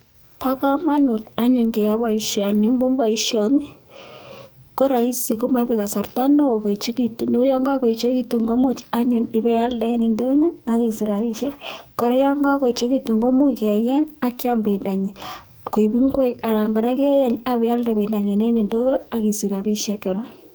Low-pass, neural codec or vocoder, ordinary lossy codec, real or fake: none; codec, 44.1 kHz, 2.6 kbps, SNAC; none; fake